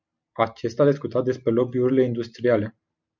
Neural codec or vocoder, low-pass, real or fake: none; 7.2 kHz; real